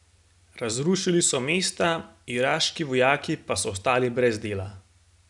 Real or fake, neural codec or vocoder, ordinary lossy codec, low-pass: real; none; none; 10.8 kHz